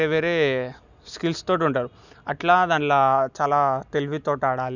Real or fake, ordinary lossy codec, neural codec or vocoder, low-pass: real; none; none; 7.2 kHz